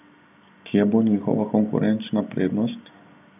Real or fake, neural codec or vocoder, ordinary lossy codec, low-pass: real; none; none; 3.6 kHz